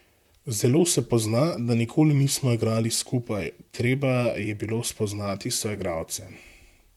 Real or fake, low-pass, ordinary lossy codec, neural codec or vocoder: fake; 19.8 kHz; MP3, 96 kbps; vocoder, 44.1 kHz, 128 mel bands, Pupu-Vocoder